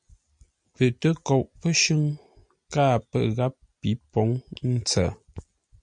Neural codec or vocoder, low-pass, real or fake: none; 9.9 kHz; real